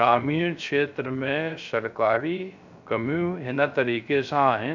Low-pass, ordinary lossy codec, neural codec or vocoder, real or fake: 7.2 kHz; none; codec, 16 kHz, 0.3 kbps, FocalCodec; fake